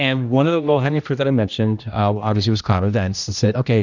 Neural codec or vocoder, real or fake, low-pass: codec, 16 kHz, 1 kbps, X-Codec, HuBERT features, trained on general audio; fake; 7.2 kHz